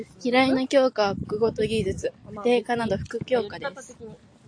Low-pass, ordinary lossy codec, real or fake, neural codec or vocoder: 9.9 kHz; AAC, 48 kbps; real; none